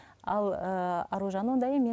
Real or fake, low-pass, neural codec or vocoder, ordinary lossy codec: real; none; none; none